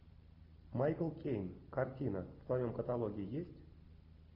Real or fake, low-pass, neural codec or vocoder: real; 5.4 kHz; none